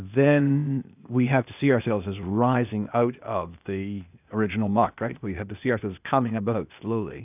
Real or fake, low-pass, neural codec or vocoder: fake; 3.6 kHz; codec, 16 kHz, 0.8 kbps, ZipCodec